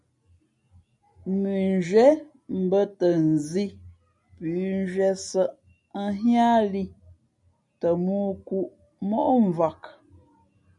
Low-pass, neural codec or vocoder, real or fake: 10.8 kHz; none; real